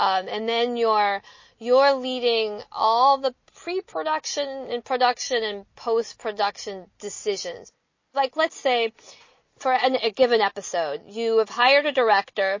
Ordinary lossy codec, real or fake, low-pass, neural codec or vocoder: MP3, 32 kbps; real; 7.2 kHz; none